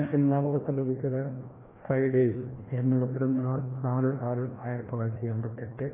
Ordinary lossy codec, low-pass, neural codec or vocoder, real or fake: AAC, 16 kbps; 3.6 kHz; codec, 16 kHz, 1 kbps, FreqCodec, larger model; fake